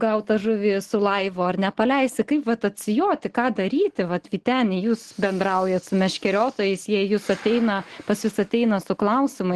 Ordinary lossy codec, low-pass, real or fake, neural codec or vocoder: Opus, 16 kbps; 10.8 kHz; real; none